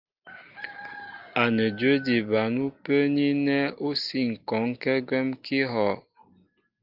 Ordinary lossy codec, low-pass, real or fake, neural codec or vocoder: Opus, 32 kbps; 5.4 kHz; real; none